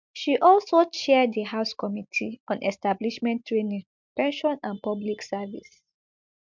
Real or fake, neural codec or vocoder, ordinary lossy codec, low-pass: real; none; MP3, 64 kbps; 7.2 kHz